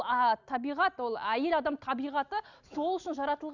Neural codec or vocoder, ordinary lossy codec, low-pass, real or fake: none; none; 7.2 kHz; real